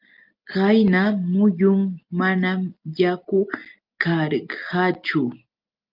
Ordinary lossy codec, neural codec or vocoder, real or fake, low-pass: Opus, 24 kbps; none; real; 5.4 kHz